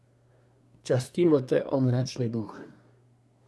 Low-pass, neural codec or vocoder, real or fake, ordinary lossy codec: none; codec, 24 kHz, 1 kbps, SNAC; fake; none